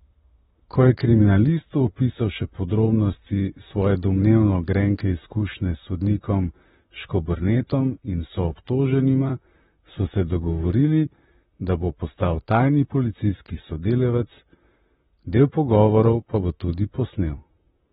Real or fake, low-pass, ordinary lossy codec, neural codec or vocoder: fake; 19.8 kHz; AAC, 16 kbps; vocoder, 44.1 kHz, 128 mel bands, Pupu-Vocoder